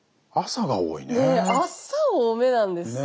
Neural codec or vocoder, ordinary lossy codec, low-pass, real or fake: none; none; none; real